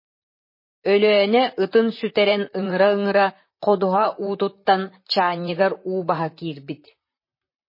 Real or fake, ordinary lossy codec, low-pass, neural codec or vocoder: fake; MP3, 24 kbps; 5.4 kHz; vocoder, 44.1 kHz, 128 mel bands, Pupu-Vocoder